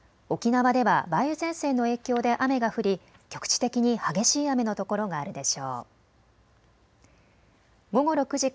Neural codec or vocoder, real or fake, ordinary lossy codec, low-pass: none; real; none; none